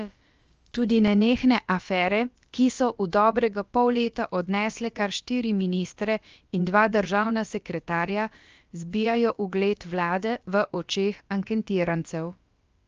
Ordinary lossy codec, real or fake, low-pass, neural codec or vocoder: Opus, 24 kbps; fake; 7.2 kHz; codec, 16 kHz, about 1 kbps, DyCAST, with the encoder's durations